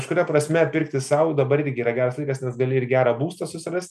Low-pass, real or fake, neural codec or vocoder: 14.4 kHz; real; none